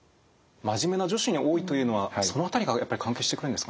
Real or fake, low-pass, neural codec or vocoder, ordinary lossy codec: real; none; none; none